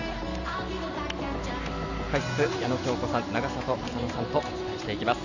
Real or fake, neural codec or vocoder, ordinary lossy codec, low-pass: real; none; none; 7.2 kHz